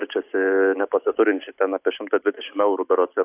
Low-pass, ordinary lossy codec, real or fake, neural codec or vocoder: 3.6 kHz; AAC, 32 kbps; real; none